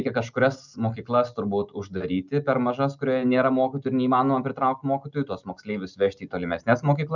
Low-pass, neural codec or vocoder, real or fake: 7.2 kHz; none; real